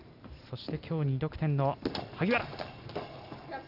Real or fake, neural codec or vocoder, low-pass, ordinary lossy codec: real; none; 5.4 kHz; none